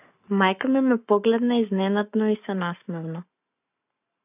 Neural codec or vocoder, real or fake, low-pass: codec, 44.1 kHz, 7.8 kbps, Pupu-Codec; fake; 3.6 kHz